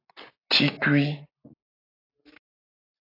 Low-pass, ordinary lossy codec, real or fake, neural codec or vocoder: 5.4 kHz; AAC, 24 kbps; real; none